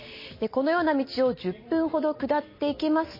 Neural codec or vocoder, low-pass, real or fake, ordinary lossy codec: none; 5.4 kHz; real; MP3, 24 kbps